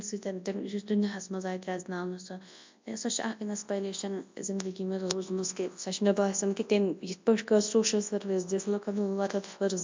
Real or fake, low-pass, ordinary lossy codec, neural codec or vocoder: fake; 7.2 kHz; MP3, 64 kbps; codec, 24 kHz, 0.9 kbps, WavTokenizer, large speech release